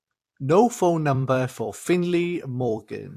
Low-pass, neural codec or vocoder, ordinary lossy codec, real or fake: 14.4 kHz; vocoder, 44.1 kHz, 128 mel bands every 256 samples, BigVGAN v2; AAC, 64 kbps; fake